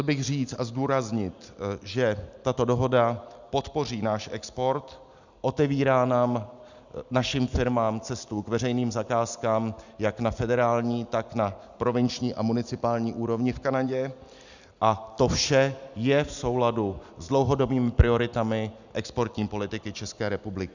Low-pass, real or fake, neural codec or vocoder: 7.2 kHz; real; none